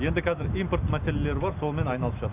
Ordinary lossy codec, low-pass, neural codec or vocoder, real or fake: none; 3.6 kHz; none; real